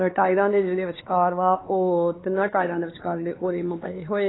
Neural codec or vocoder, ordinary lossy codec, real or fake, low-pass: codec, 16 kHz, 4 kbps, X-Codec, HuBERT features, trained on LibriSpeech; AAC, 16 kbps; fake; 7.2 kHz